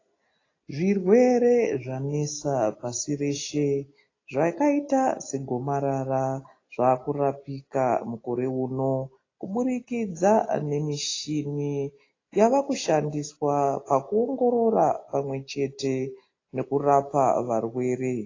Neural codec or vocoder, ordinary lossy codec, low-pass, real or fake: none; AAC, 32 kbps; 7.2 kHz; real